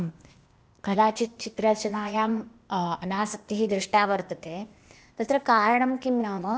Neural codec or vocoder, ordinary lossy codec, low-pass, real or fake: codec, 16 kHz, 0.8 kbps, ZipCodec; none; none; fake